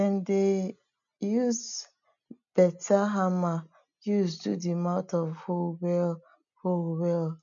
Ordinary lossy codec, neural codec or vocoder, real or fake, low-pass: none; none; real; 7.2 kHz